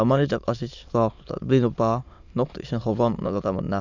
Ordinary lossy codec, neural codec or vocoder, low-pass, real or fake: none; autoencoder, 22.05 kHz, a latent of 192 numbers a frame, VITS, trained on many speakers; 7.2 kHz; fake